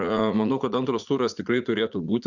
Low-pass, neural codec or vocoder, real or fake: 7.2 kHz; vocoder, 44.1 kHz, 80 mel bands, Vocos; fake